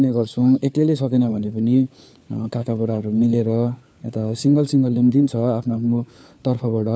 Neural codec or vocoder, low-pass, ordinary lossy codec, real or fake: codec, 16 kHz, 4 kbps, FunCodec, trained on LibriTTS, 50 frames a second; none; none; fake